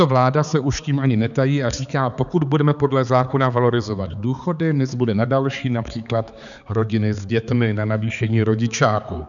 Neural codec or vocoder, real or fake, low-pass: codec, 16 kHz, 4 kbps, X-Codec, HuBERT features, trained on balanced general audio; fake; 7.2 kHz